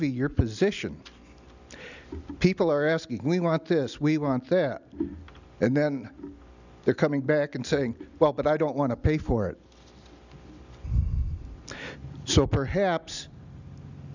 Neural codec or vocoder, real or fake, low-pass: none; real; 7.2 kHz